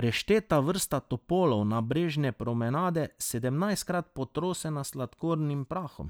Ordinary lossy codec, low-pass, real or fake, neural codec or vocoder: none; none; real; none